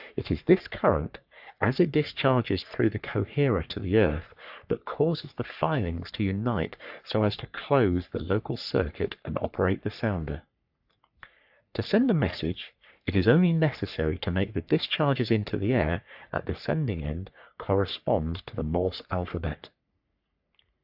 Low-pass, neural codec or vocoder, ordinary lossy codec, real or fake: 5.4 kHz; codec, 44.1 kHz, 3.4 kbps, Pupu-Codec; AAC, 48 kbps; fake